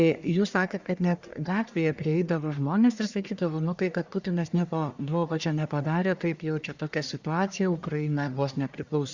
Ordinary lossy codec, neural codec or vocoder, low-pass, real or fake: Opus, 64 kbps; codec, 44.1 kHz, 1.7 kbps, Pupu-Codec; 7.2 kHz; fake